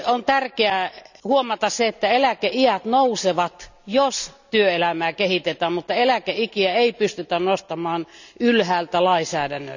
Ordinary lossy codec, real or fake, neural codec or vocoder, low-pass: none; real; none; 7.2 kHz